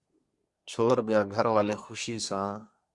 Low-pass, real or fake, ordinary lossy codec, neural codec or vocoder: 10.8 kHz; fake; Opus, 64 kbps; codec, 24 kHz, 1 kbps, SNAC